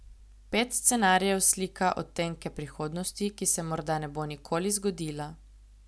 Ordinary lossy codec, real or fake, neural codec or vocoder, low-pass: none; real; none; none